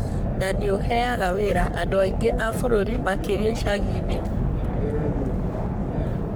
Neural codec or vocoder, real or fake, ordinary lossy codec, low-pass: codec, 44.1 kHz, 3.4 kbps, Pupu-Codec; fake; none; none